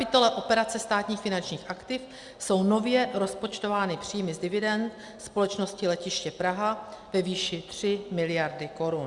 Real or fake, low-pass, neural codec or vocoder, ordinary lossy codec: real; 10.8 kHz; none; Opus, 64 kbps